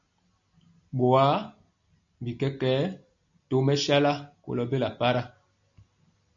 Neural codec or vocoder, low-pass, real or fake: none; 7.2 kHz; real